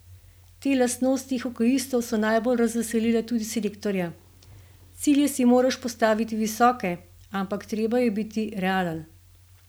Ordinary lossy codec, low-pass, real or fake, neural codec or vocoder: none; none; real; none